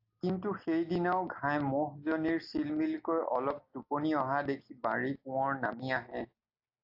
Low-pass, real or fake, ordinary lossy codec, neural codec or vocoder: 5.4 kHz; real; AAC, 48 kbps; none